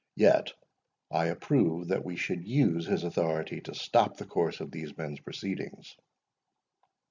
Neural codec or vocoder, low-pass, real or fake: none; 7.2 kHz; real